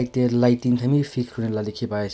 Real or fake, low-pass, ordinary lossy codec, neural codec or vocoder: real; none; none; none